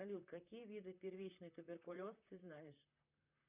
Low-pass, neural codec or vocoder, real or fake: 3.6 kHz; vocoder, 44.1 kHz, 128 mel bands, Pupu-Vocoder; fake